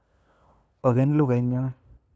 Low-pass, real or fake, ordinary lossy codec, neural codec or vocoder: none; fake; none; codec, 16 kHz, 2 kbps, FunCodec, trained on LibriTTS, 25 frames a second